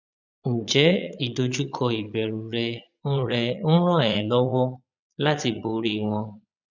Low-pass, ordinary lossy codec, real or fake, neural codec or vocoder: 7.2 kHz; none; fake; vocoder, 22.05 kHz, 80 mel bands, Vocos